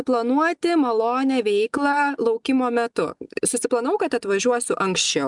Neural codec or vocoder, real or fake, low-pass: none; real; 10.8 kHz